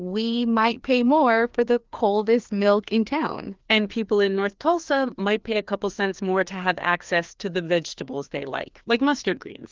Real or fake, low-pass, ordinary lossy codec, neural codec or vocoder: fake; 7.2 kHz; Opus, 32 kbps; codec, 16 kHz, 2 kbps, FreqCodec, larger model